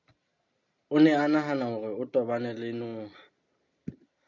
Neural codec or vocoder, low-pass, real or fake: none; 7.2 kHz; real